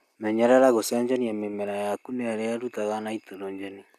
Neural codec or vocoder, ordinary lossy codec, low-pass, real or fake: none; none; 14.4 kHz; real